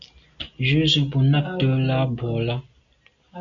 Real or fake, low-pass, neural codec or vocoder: real; 7.2 kHz; none